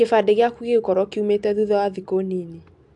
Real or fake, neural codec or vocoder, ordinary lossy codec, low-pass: real; none; AAC, 64 kbps; 10.8 kHz